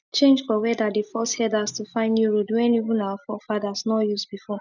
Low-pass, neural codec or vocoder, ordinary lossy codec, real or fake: 7.2 kHz; none; none; real